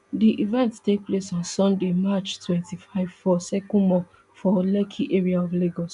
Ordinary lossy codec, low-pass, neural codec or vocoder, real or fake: none; 10.8 kHz; none; real